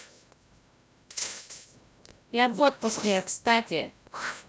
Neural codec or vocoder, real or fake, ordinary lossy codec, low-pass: codec, 16 kHz, 0.5 kbps, FreqCodec, larger model; fake; none; none